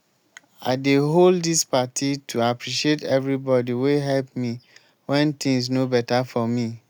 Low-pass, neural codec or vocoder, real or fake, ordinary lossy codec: none; none; real; none